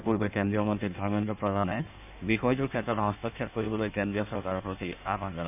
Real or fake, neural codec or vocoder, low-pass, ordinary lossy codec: fake; codec, 16 kHz in and 24 kHz out, 1.1 kbps, FireRedTTS-2 codec; 3.6 kHz; none